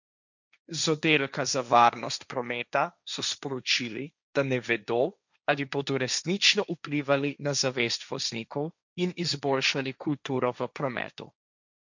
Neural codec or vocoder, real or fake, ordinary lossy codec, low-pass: codec, 16 kHz, 1.1 kbps, Voila-Tokenizer; fake; none; 7.2 kHz